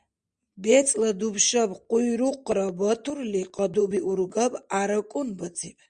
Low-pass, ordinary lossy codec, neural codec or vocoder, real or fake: 9.9 kHz; Opus, 64 kbps; none; real